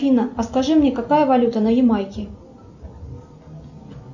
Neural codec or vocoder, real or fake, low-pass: none; real; 7.2 kHz